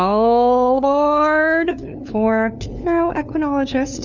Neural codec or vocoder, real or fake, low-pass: codec, 16 kHz, 2 kbps, FunCodec, trained on LibriTTS, 25 frames a second; fake; 7.2 kHz